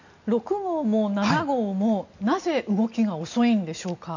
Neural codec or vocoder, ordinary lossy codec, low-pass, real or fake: none; none; 7.2 kHz; real